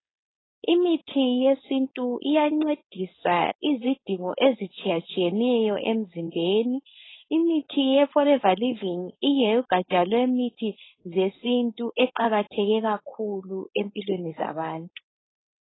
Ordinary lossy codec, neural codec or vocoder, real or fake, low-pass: AAC, 16 kbps; codec, 16 kHz, 4.8 kbps, FACodec; fake; 7.2 kHz